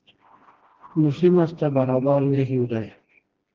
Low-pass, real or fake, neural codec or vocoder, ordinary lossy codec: 7.2 kHz; fake; codec, 16 kHz, 1 kbps, FreqCodec, smaller model; Opus, 16 kbps